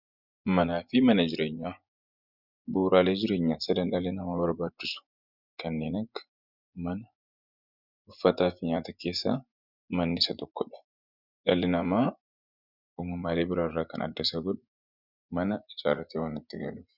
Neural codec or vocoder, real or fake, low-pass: vocoder, 24 kHz, 100 mel bands, Vocos; fake; 5.4 kHz